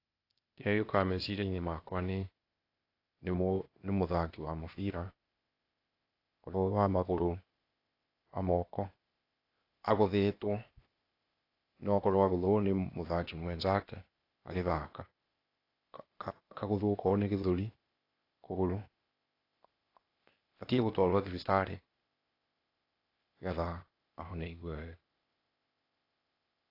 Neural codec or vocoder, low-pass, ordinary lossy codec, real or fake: codec, 16 kHz, 0.8 kbps, ZipCodec; 5.4 kHz; AAC, 32 kbps; fake